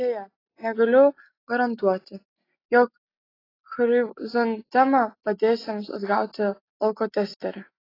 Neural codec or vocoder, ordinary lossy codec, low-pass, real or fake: none; AAC, 24 kbps; 5.4 kHz; real